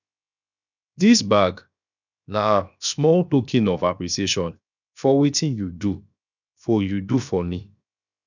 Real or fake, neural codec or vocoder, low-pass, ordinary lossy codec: fake; codec, 16 kHz, 0.7 kbps, FocalCodec; 7.2 kHz; none